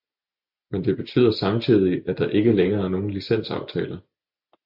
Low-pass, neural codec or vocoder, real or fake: 5.4 kHz; none; real